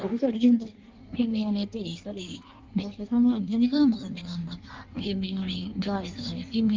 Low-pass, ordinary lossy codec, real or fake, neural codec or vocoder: 7.2 kHz; Opus, 32 kbps; fake; codec, 16 kHz in and 24 kHz out, 1.1 kbps, FireRedTTS-2 codec